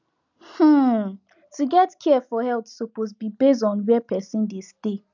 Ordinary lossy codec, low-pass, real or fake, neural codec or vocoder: none; 7.2 kHz; real; none